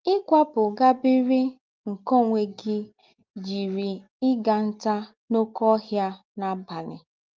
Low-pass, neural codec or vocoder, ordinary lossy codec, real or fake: 7.2 kHz; none; Opus, 32 kbps; real